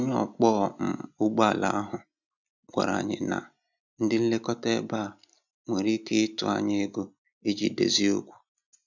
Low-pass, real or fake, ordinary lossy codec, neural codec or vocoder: 7.2 kHz; real; none; none